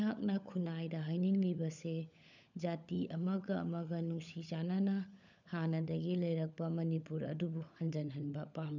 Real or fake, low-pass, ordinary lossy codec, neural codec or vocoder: fake; 7.2 kHz; none; codec, 16 kHz, 16 kbps, FunCodec, trained on LibriTTS, 50 frames a second